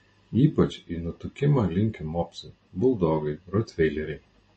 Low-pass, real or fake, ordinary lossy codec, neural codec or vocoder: 9.9 kHz; real; MP3, 32 kbps; none